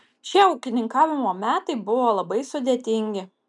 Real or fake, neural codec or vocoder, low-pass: real; none; 10.8 kHz